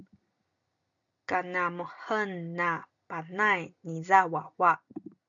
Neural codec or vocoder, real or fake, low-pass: none; real; 7.2 kHz